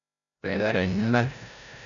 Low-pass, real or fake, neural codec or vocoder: 7.2 kHz; fake; codec, 16 kHz, 0.5 kbps, FreqCodec, larger model